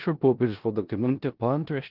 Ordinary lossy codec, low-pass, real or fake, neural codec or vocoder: Opus, 16 kbps; 5.4 kHz; fake; codec, 16 kHz in and 24 kHz out, 0.4 kbps, LongCat-Audio-Codec, four codebook decoder